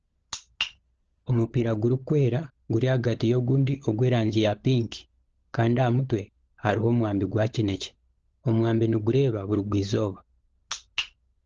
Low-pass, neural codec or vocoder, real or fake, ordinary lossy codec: 7.2 kHz; codec, 16 kHz, 16 kbps, FunCodec, trained on LibriTTS, 50 frames a second; fake; Opus, 16 kbps